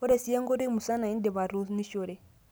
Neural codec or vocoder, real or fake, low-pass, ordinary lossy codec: none; real; none; none